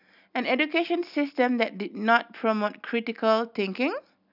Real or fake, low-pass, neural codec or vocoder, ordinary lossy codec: real; 5.4 kHz; none; none